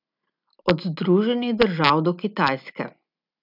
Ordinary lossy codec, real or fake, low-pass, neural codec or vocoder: none; real; 5.4 kHz; none